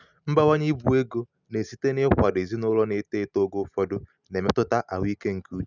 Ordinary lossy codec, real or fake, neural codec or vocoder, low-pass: none; real; none; 7.2 kHz